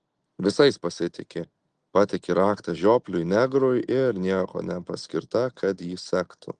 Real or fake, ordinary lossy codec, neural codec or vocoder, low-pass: real; Opus, 24 kbps; none; 9.9 kHz